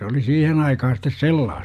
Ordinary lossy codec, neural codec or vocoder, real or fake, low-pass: MP3, 96 kbps; none; real; 14.4 kHz